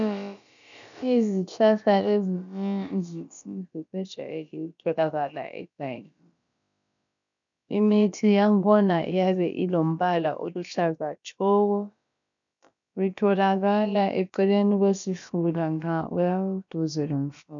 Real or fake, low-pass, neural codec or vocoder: fake; 7.2 kHz; codec, 16 kHz, about 1 kbps, DyCAST, with the encoder's durations